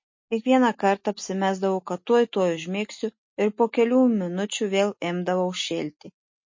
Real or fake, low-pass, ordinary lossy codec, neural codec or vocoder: real; 7.2 kHz; MP3, 32 kbps; none